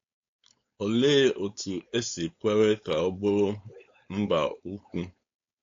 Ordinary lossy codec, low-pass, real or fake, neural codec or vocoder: AAC, 48 kbps; 7.2 kHz; fake; codec, 16 kHz, 4.8 kbps, FACodec